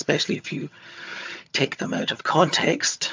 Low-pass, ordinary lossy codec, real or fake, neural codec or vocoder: 7.2 kHz; MP3, 64 kbps; fake; vocoder, 22.05 kHz, 80 mel bands, HiFi-GAN